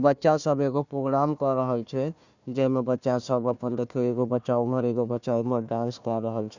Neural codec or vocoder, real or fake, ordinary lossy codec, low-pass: codec, 16 kHz, 1 kbps, FunCodec, trained on Chinese and English, 50 frames a second; fake; none; 7.2 kHz